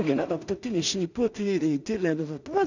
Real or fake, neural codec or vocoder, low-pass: fake; codec, 16 kHz in and 24 kHz out, 0.4 kbps, LongCat-Audio-Codec, two codebook decoder; 7.2 kHz